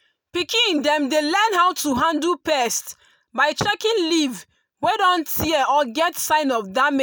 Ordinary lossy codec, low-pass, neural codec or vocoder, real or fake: none; none; none; real